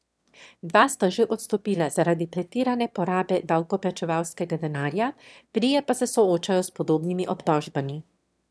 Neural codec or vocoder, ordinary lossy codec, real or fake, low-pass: autoencoder, 22.05 kHz, a latent of 192 numbers a frame, VITS, trained on one speaker; none; fake; none